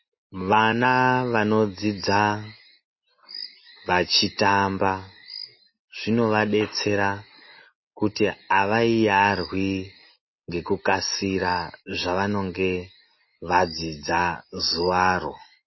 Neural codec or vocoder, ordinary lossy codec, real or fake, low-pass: none; MP3, 24 kbps; real; 7.2 kHz